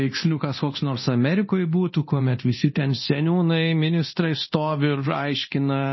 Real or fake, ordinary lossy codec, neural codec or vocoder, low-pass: fake; MP3, 24 kbps; codec, 24 kHz, 0.9 kbps, DualCodec; 7.2 kHz